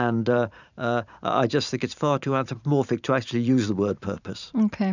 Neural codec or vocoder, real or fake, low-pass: vocoder, 44.1 kHz, 128 mel bands every 512 samples, BigVGAN v2; fake; 7.2 kHz